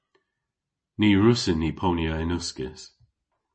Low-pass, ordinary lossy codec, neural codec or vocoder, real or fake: 9.9 kHz; MP3, 32 kbps; none; real